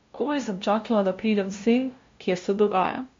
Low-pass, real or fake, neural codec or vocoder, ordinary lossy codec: 7.2 kHz; fake; codec, 16 kHz, 0.5 kbps, FunCodec, trained on LibriTTS, 25 frames a second; MP3, 48 kbps